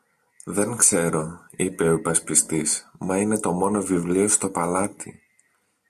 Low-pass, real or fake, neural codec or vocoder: 14.4 kHz; real; none